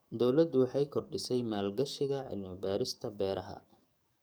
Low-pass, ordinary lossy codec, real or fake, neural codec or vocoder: none; none; fake; codec, 44.1 kHz, 7.8 kbps, DAC